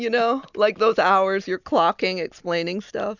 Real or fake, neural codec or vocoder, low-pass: real; none; 7.2 kHz